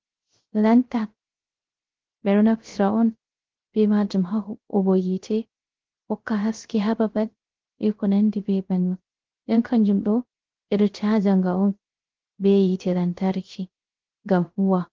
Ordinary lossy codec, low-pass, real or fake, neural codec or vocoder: Opus, 16 kbps; 7.2 kHz; fake; codec, 16 kHz, 0.3 kbps, FocalCodec